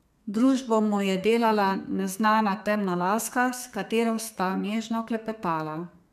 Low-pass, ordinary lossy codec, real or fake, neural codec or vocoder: 14.4 kHz; none; fake; codec, 32 kHz, 1.9 kbps, SNAC